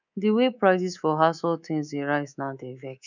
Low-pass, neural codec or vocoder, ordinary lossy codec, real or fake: 7.2 kHz; codec, 24 kHz, 3.1 kbps, DualCodec; none; fake